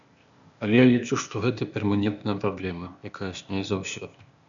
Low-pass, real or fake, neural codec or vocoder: 7.2 kHz; fake; codec, 16 kHz, 0.8 kbps, ZipCodec